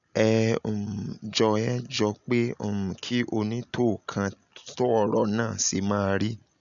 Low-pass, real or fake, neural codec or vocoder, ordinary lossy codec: 7.2 kHz; real; none; none